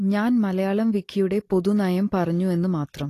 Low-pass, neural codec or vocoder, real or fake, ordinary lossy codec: 14.4 kHz; none; real; AAC, 48 kbps